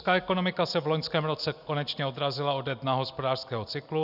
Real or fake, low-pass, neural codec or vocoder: real; 5.4 kHz; none